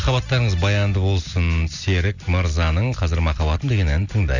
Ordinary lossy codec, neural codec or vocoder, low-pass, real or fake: none; none; 7.2 kHz; real